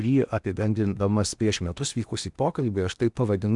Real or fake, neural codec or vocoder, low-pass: fake; codec, 16 kHz in and 24 kHz out, 0.8 kbps, FocalCodec, streaming, 65536 codes; 10.8 kHz